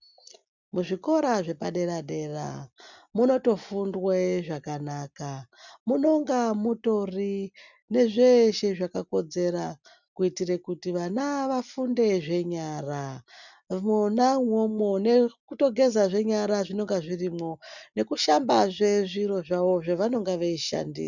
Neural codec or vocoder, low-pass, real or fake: none; 7.2 kHz; real